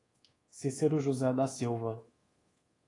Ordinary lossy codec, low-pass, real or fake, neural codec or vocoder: AAC, 32 kbps; 10.8 kHz; fake; codec, 24 kHz, 1.2 kbps, DualCodec